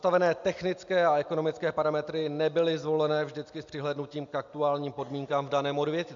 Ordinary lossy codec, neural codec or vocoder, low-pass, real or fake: MP3, 64 kbps; none; 7.2 kHz; real